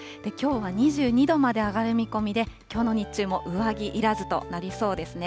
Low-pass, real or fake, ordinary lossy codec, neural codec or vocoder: none; real; none; none